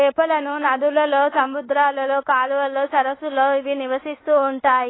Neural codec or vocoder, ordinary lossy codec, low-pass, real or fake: codec, 24 kHz, 1.2 kbps, DualCodec; AAC, 16 kbps; 7.2 kHz; fake